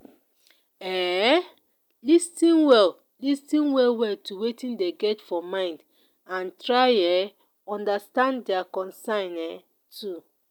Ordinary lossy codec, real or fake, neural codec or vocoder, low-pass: none; real; none; 19.8 kHz